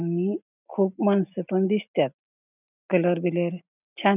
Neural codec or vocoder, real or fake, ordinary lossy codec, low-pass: none; real; none; 3.6 kHz